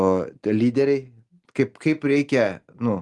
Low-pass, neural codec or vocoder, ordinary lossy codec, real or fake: 10.8 kHz; none; Opus, 24 kbps; real